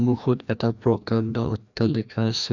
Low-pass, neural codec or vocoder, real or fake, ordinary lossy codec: 7.2 kHz; codec, 16 kHz, 1 kbps, FreqCodec, larger model; fake; none